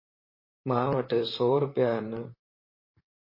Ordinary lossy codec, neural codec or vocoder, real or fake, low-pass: MP3, 24 kbps; vocoder, 44.1 kHz, 128 mel bands, Pupu-Vocoder; fake; 5.4 kHz